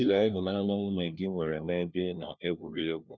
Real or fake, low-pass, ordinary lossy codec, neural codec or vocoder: fake; none; none; codec, 16 kHz, 1 kbps, FunCodec, trained on LibriTTS, 50 frames a second